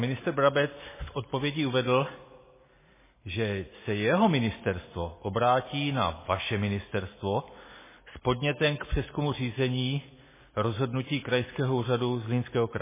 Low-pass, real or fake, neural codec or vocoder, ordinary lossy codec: 3.6 kHz; real; none; MP3, 16 kbps